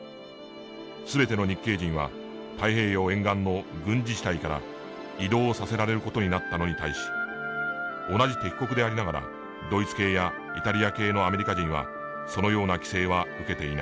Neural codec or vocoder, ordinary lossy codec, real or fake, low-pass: none; none; real; none